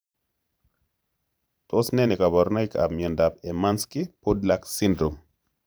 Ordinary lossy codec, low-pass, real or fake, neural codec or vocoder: none; none; fake; vocoder, 44.1 kHz, 128 mel bands every 512 samples, BigVGAN v2